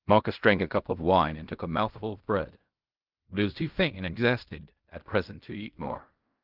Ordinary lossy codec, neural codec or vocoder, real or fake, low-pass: Opus, 24 kbps; codec, 16 kHz in and 24 kHz out, 0.4 kbps, LongCat-Audio-Codec, fine tuned four codebook decoder; fake; 5.4 kHz